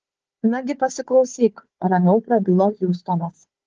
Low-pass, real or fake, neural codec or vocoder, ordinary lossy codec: 7.2 kHz; fake; codec, 16 kHz, 4 kbps, FunCodec, trained on Chinese and English, 50 frames a second; Opus, 16 kbps